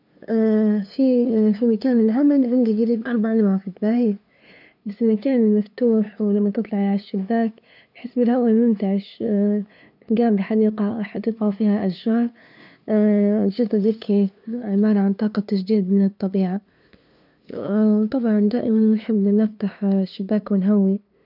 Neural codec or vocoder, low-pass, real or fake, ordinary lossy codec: codec, 16 kHz, 2 kbps, FunCodec, trained on LibriTTS, 25 frames a second; 5.4 kHz; fake; none